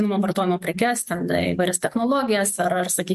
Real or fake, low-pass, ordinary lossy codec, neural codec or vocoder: fake; 14.4 kHz; MP3, 64 kbps; codec, 44.1 kHz, 7.8 kbps, DAC